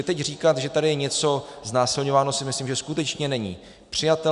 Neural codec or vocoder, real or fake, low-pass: none; real; 10.8 kHz